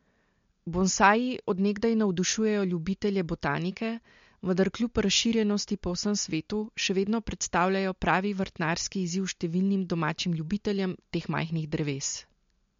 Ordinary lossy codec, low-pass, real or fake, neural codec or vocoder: MP3, 48 kbps; 7.2 kHz; real; none